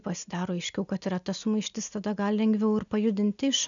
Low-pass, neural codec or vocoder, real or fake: 7.2 kHz; none; real